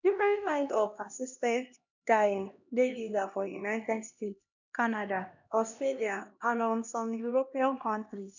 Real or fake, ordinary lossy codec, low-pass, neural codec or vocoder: fake; none; 7.2 kHz; codec, 16 kHz, 1 kbps, X-Codec, HuBERT features, trained on LibriSpeech